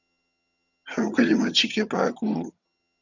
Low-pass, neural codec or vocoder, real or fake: 7.2 kHz; vocoder, 22.05 kHz, 80 mel bands, HiFi-GAN; fake